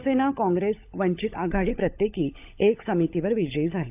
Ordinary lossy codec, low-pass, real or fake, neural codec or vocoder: AAC, 32 kbps; 3.6 kHz; fake; codec, 16 kHz, 16 kbps, FunCodec, trained on LibriTTS, 50 frames a second